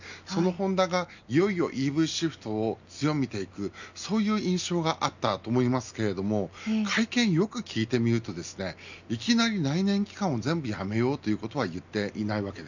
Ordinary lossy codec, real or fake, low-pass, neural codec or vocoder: none; real; 7.2 kHz; none